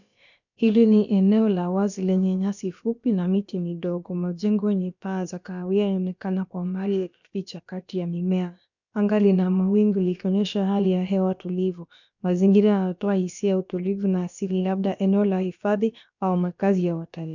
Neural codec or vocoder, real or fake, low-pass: codec, 16 kHz, about 1 kbps, DyCAST, with the encoder's durations; fake; 7.2 kHz